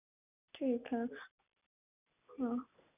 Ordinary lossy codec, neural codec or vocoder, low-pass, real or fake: none; none; 3.6 kHz; real